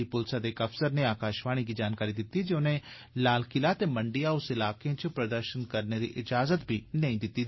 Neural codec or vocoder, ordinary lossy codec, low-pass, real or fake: none; MP3, 24 kbps; 7.2 kHz; real